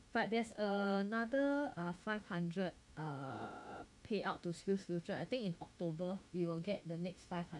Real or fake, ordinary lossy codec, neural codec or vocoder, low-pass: fake; none; autoencoder, 48 kHz, 32 numbers a frame, DAC-VAE, trained on Japanese speech; 10.8 kHz